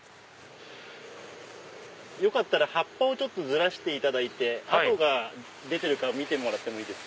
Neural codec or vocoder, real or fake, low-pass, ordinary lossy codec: none; real; none; none